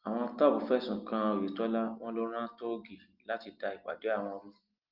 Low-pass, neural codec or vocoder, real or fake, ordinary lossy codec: 5.4 kHz; none; real; Opus, 32 kbps